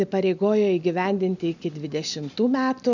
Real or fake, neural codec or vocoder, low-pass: real; none; 7.2 kHz